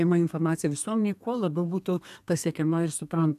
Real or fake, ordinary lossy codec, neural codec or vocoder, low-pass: fake; AAC, 96 kbps; codec, 32 kHz, 1.9 kbps, SNAC; 14.4 kHz